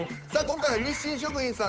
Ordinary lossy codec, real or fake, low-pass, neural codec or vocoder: none; fake; none; codec, 16 kHz, 8 kbps, FunCodec, trained on Chinese and English, 25 frames a second